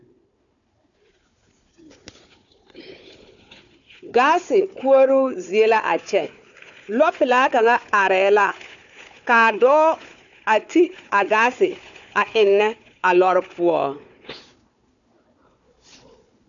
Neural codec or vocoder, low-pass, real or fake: codec, 16 kHz, 4 kbps, FunCodec, trained on Chinese and English, 50 frames a second; 7.2 kHz; fake